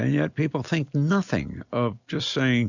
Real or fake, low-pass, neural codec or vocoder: real; 7.2 kHz; none